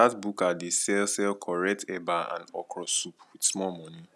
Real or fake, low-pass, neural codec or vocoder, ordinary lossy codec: real; none; none; none